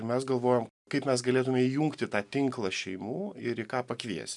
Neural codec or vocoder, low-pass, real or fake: none; 10.8 kHz; real